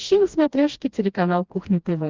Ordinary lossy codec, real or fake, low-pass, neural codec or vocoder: Opus, 32 kbps; fake; 7.2 kHz; codec, 16 kHz, 1 kbps, FreqCodec, smaller model